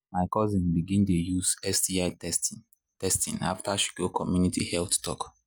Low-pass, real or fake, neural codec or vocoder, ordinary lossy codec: none; real; none; none